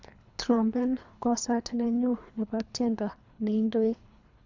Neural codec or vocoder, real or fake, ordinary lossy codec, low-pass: codec, 24 kHz, 3 kbps, HILCodec; fake; none; 7.2 kHz